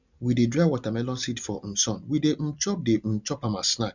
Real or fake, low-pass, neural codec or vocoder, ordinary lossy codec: real; 7.2 kHz; none; none